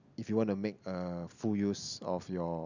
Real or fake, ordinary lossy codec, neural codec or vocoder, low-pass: real; none; none; 7.2 kHz